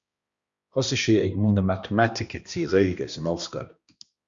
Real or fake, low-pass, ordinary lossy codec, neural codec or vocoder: fake; 7.2 kHz; Opus, 64 kbps; codec, 16 kHz, 1 kbps, X-Codec, HuBERT features, trained on balanced general audio